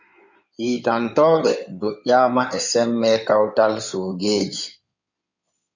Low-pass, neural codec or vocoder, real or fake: 7.2 kHz; codec, 16 kHz in and 24 kHz out, 2.2 kbps, FireRedTTS-2 codec; fake